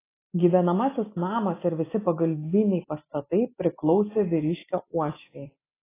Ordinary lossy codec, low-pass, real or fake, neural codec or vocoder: AAC, 16 kbps; 3.6 kHz; real; none